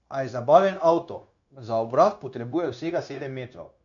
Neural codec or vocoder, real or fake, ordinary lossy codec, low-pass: codec, 16 kHz, 0.9 kbps, LongCat-Audio-Codec; fake; none; 7.2 kHz